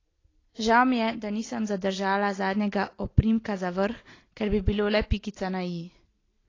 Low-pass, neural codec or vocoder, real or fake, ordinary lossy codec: 7.2 kHz; none; real; AAC, 32 kbps